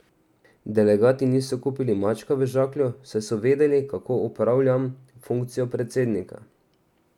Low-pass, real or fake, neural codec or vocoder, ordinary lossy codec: 19.8 kHz; real; none; none